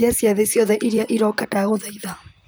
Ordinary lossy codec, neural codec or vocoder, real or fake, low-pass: none; vocoder, 44.1 kHz, 128 mel bands, Pupu-Vocoder; fake; none